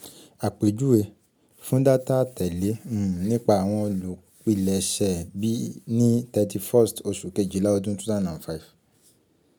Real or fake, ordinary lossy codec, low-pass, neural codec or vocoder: real; none; none; none